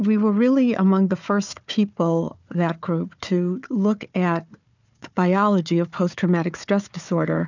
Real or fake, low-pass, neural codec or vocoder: fake; 7.2 kHz; codec, 16 kHz, 4 kbps, FunCodec, trained on Chinese and English, 50 frames a second